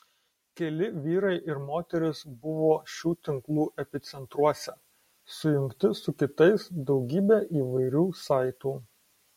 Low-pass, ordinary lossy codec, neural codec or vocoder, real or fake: 19.8 kHz; MP3, 64 kbps; none; real